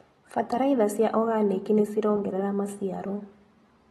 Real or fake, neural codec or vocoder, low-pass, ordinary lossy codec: real; none; 19.8 kHz; AAC, 32 kbps